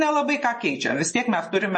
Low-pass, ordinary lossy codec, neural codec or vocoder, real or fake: 9.9 kHz; MP3, 32 kbps; none; real